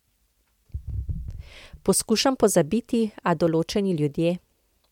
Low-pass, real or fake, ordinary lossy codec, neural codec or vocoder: 19.8 kHz; real; MP3, 96 kbps; none